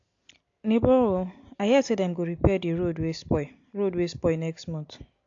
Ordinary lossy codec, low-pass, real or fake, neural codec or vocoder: AAC, 48 kbps; 7.2 kHz; real; none